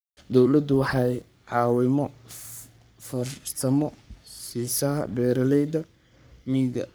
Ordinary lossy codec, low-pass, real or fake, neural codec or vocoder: none; none; fake; codec, 44.1 kHz, 3.4 kbps, Pupu-Codec